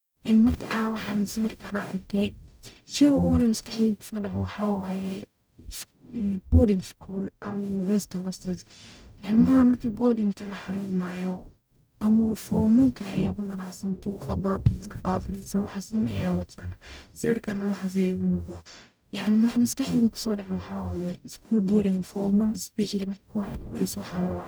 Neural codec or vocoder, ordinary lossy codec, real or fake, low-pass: codec, 44.1 kHz, 0.9 kbps, DAC; none; fake; none